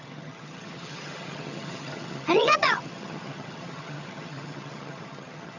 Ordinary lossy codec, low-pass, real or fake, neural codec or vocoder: none; 7.2 kHz; fake; vocoder, 22.05 kHz, 80 mel bands, HiFi-GAN